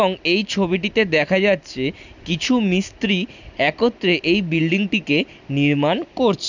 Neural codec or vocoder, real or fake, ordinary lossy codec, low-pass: none; real; none; 7.2 kHz